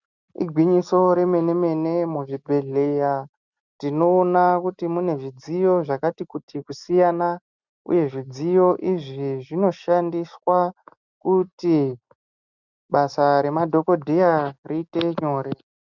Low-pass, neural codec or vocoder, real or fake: 7.2 kHz; none; real